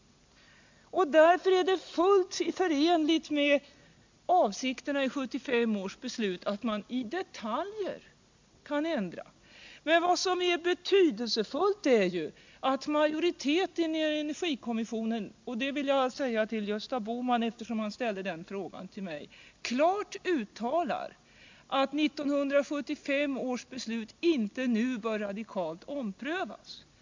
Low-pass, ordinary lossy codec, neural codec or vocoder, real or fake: 7.2 kHz; MP3, 64 kbps; none; real